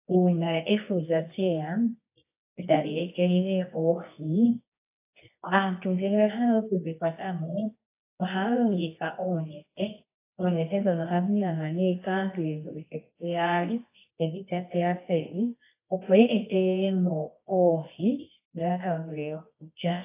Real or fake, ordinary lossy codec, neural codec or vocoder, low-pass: fake; AAC, 24 kbps; codec, 24 kHz, 0.9 kbps, WavTokenizer, medium music audio release; 3.6 kHz